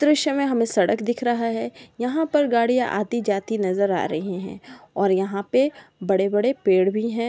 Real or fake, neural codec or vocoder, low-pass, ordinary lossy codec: real; none; none; none